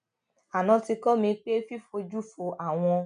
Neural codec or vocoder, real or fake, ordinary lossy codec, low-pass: none; real; none; 9.9 kHz